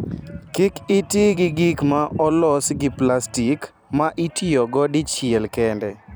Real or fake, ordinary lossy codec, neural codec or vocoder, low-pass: fake; none; vocoder, 44.1 kHz, 128 mel bands every 256 samples, BigVGAN v2; none